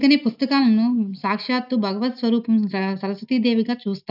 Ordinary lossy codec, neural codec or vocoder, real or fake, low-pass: none; none; real; 5.4 kHz